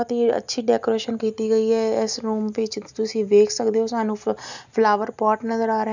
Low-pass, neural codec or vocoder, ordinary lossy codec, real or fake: 7.2 kHz; none; none; real